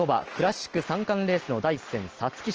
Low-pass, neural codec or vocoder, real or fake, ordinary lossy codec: 7.2 kHz; none; real; Opus, 16 kbps